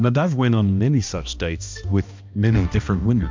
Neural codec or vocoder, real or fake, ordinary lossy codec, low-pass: codec, 16 kHz, 1 kbps, X-Codec, HuBERT features, trained on balanced general audio; fake; MP3, 64 kbps; 7.2 kHz